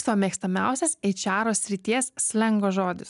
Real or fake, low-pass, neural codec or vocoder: real; 10.8 kHz; none